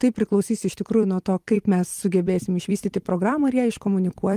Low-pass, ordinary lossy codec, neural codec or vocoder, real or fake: 14.4 kHz; Opus, 24 kbps; vocoder, 44.1 kHz, 128 mel bands every 256 samples, BigVGAN v2; fake